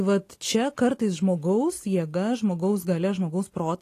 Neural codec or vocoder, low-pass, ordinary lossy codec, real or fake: none; 14.4 kHz; AAC, 48 kbps; real